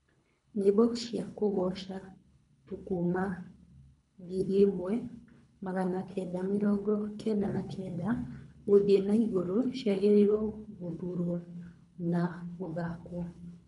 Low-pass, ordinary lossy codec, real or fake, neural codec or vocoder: 10.8 kHz; none; fake; codec, 24 kHz, 3 kbps, HILCodec